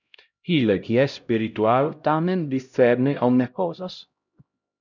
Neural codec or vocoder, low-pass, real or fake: codec, 16 kHz, 0.5 kbps, X-Codec, HuBERT features, trained on LibriSpeech; 7.2 kHz; fake